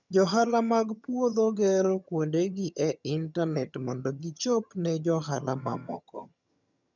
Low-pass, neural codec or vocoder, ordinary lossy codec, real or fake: 7.2 kHz; vocoder, 22.05 kHz, 80 mel bands, HiFi-GAN; none; fake